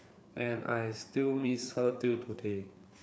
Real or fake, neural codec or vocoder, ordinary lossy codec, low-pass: fake; codec, 16 kHz, 4 kbps, FreqCodec, larger model; none; none